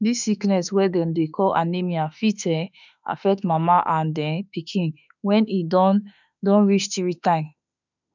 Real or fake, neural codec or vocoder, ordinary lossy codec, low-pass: fake; autoencoder, 48 kHz, 32 numbers a frame, DAC-VAE, trained on Japanese speech; none; 7.2 kHz